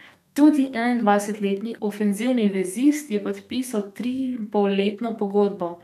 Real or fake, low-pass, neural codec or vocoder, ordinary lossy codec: fake; 14.4 kHz; codec, 32 kHz, 1.9 kbps, SNAC; none